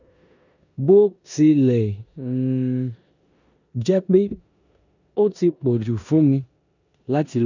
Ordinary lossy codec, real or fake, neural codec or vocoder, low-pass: none; fake; codec, 16 kHz in and 24 kHz out, 0.9 kbps, LongCat-Audio-Codec, four codebook decoder; 7.2 kHz